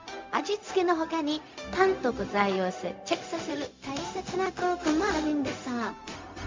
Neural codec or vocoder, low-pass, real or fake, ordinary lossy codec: codec, 16 kHz, 0.4 kbps, LongCat-Audio-Codec; 7.2 kHz; fake; MP3, 64 kbps